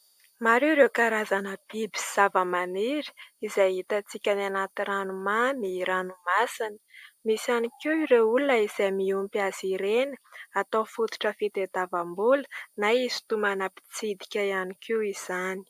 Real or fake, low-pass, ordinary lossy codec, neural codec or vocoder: real; 14.4 kHz; AAC, 96 kbps; none